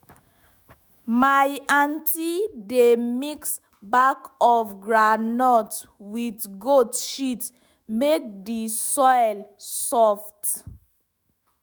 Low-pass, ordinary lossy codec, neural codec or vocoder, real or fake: none; none; autoencoder, 48 kHz, 128 numbers a frame, DAC-VAE, trained on Japanese speech; fake